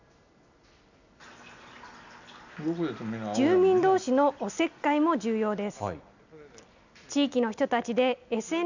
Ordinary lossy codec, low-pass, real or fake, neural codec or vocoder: none; 7.2 kHz; real; none